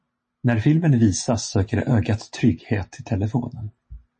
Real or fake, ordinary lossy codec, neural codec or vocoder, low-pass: real; MP3, 32 kbps; none; 10.8 kHz